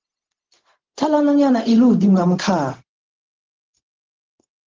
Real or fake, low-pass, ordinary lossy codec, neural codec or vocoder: fake; 7.2 kHz; Opus, 16 kbps; codec, 16 kHz, 0.4 kbps, LongCat-Audio-Codec